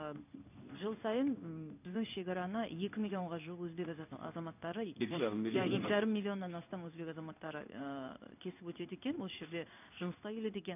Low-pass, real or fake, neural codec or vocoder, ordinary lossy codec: 3.6 kHz; fake; codec, 16 kHz in and 24 kHz out, 1 kbps, XY-Tokenizer; none